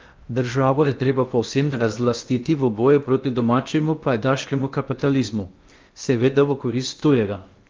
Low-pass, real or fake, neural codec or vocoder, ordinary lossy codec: 7.2 kHz; fake; codec, 16 kHz in and 24 kHz out, 0.8 kbps, FocalCodec, streaming, 65536 codes; Opus, 32 kbps